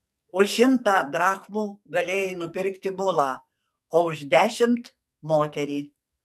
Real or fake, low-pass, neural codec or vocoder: fake; 14.4 kHz; codec, 44.1 kHz, 2.6 kbps, SNAC